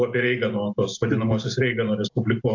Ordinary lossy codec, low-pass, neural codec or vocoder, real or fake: AAC, 48 kbps; 7.2 kHz; none; real